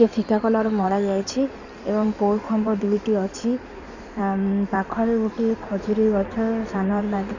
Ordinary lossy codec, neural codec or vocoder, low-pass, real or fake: none; codec, 16 kHz in and 24 kHz out, 2.2 kbps, FireRedTTS-2 codec; 7.2 kHz; fake